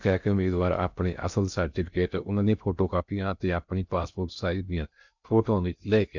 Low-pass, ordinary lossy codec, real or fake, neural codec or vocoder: 7.2 kHz; none; fake; codec, 16 kHz in and 24 kHz out, 0.6 kbps, FocalCodec, streaming, 2048 codes